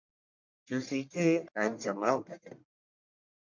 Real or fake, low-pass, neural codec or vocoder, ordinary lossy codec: fake; 7.2 kHz; codec, 44.1 kHz, 1.7 kbps, Pupu-Codec; MP3, 48 kbps